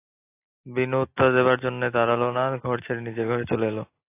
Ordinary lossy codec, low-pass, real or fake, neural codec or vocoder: AAC, 24 kbps; 3.6 kHz; real; none